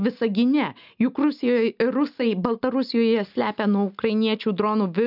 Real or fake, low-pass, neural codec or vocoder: real; 5.4 kHz; none